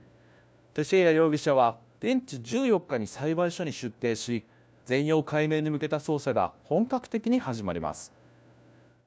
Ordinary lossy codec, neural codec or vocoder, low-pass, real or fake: none; codec, 16 kHz, 1 kbps, FunCodec, trained on LibriTTS, 50 frames a second; none; fake